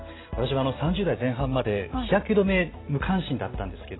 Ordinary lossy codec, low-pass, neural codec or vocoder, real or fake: AAC, 16 kbps; 7.2 kHz; none; real